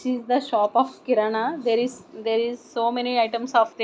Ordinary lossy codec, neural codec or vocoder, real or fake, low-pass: none; none; real; none